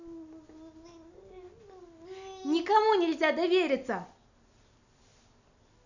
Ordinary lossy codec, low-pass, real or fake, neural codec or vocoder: none; 7.2 kHz; real; none